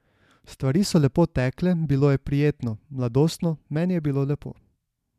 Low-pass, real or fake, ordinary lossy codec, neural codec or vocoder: 14.4 kHz; real; MP3, 96 kbps; none